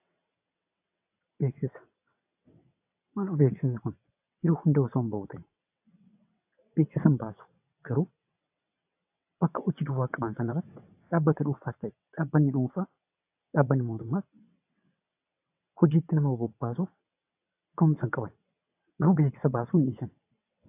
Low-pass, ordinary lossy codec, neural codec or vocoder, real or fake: 3.6 kHz; AAC, 32 kbps; vocoder, 22.05 kHz, 80 mel bands, WaveNeXt; fake